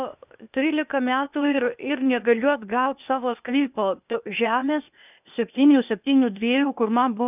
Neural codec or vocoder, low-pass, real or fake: codec, 16 kHz, 0.8 kbps, ZipCodec; 3.6 kHz; fake